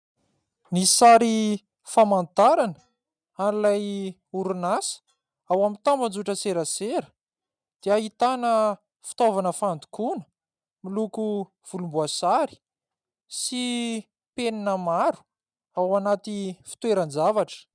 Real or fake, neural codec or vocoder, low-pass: real; none; 9.9 kHz